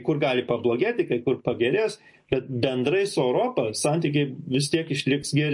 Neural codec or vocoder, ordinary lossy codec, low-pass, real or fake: none; MP3, 48 kbps; 10.8 kHz; real